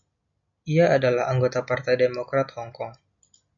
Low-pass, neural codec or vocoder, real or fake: 7.2 kHz; none; real